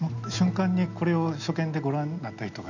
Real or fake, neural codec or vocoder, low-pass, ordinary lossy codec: real; none; 7.2 kHz; none